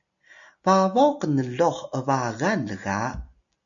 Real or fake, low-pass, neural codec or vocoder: real; 7.2 kHz; none